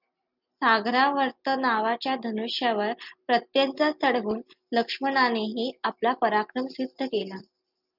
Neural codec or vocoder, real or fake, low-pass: none; real; 5.4 kHz